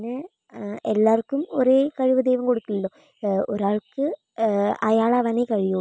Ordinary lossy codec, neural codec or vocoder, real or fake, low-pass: none; none; real; none